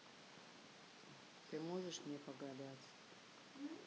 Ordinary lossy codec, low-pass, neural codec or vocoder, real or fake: none; none; none; real